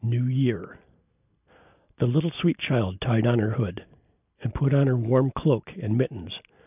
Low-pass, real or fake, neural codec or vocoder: 3.6 kHz; real; none